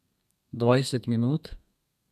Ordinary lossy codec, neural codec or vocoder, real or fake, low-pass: none; codec, 32 kHz, 1.9 kbps, SNAC; fake; 14.4 kHz